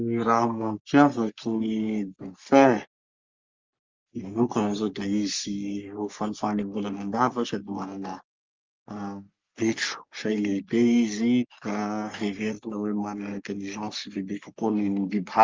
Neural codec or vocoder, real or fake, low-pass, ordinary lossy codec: codec, 44.1 kHz, 3.4 kbps, Pupu-Codec; fake; 7.2 kHz; Opus, 32 kbps